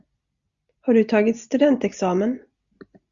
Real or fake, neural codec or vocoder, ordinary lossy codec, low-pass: real; none; Opus, 64 kbps; 7.2 kHz